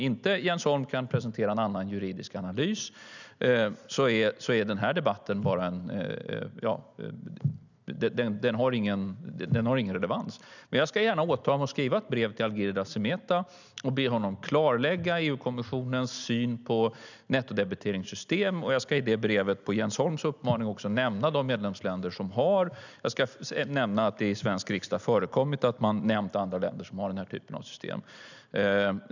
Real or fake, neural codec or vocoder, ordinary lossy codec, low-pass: real; none; none; 7.2 kHz